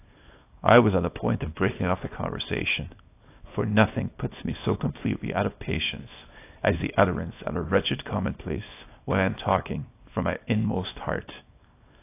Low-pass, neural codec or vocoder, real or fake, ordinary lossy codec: 3.6 kHz; codec, 24 kHz, 0.9 kbps, WavTokenizer, small release; fake; AAC, 24 kbps